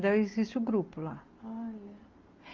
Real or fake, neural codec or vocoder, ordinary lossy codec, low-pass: real; none; Opus, 24 kbps; 7.2 kHz